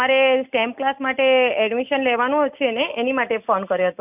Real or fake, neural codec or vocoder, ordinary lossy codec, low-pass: real; none; none; 3.6 kHz